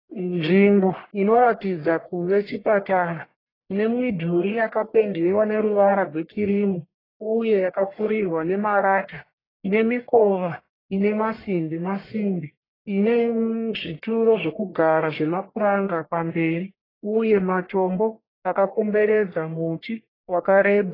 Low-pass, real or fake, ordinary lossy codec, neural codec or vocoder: 5.4 kHz; fake; AAC, 24 kbps; codec, 44.1 kHz, 1.7 kbps, Pupu-Codec